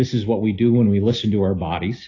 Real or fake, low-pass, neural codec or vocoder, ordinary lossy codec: real; 7.2 kHz; none; AAC, 32 kbps